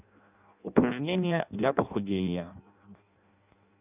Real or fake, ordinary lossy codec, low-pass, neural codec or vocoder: fake; none; 3.6 kHz; codec, 16 kHz in and 24 kHz out, 0.6 kbps, FireRedTTS-2 codec